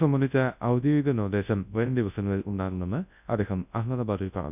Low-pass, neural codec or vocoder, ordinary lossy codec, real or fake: 3.6 kHz; codec, 24 kHz, 0.9 kbps, WavTokenizer, large speech release; none; fake